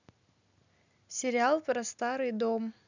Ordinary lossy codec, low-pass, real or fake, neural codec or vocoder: none; 7.2 kHz; real; none